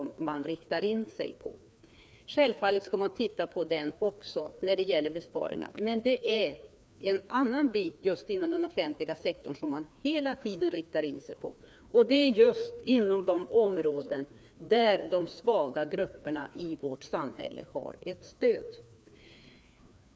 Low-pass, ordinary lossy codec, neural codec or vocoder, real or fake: none; none; codec, 16 kHz, 2 kbps, FreqCodec, larger model; fake